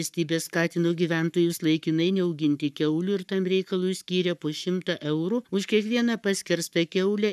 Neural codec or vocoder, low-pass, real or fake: codec, 44.1 kHz, 7.8 kbps, Pupu-Codec; 14.4 kHz; fake